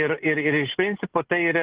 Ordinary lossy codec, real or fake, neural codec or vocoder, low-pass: Opus, 16 kbps; real; none; 3.6 kHz